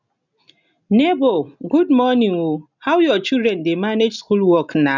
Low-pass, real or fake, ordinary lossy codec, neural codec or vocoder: 7.2 kHz; real; none; none